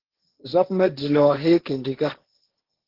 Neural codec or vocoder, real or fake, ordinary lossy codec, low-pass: codec, 16 kHz, 1.1 kbps, Voila-Tokenizer; fake; Opus, 16 kbps; 5.4 kHz